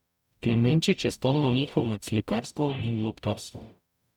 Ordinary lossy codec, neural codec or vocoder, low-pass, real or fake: none; codec, 44.1 kHz, 0.9 kbps, DAC; 19.8 kHz; fake